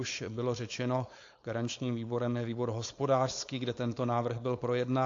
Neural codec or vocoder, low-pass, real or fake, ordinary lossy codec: codec, 16 kHz, 4.8 kbps, FACodec; 7.2 kHz; fake; AAC, 48 kbps